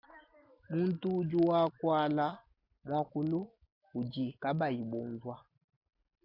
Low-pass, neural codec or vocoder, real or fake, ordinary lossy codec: 5.4 kHz; none; real; Opus, 64 kbps